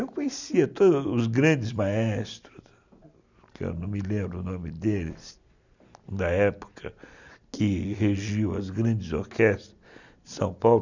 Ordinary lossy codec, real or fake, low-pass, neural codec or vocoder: none; real; 7.2 kHz; none